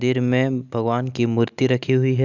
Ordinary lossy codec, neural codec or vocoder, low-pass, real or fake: none; none; 7.2 kHz; real